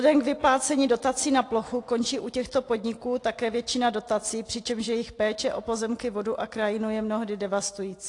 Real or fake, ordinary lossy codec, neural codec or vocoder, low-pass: real; AAC, 48 kbps; none; 10.8 kHz